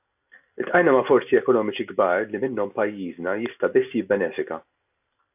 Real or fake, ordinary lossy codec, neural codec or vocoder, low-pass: real; AAC, 32 kbps; none; 3.6 kHz